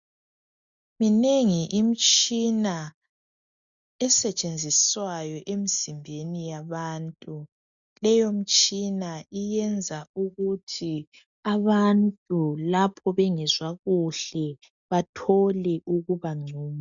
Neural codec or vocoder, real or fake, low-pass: none; real; 7.2 kHz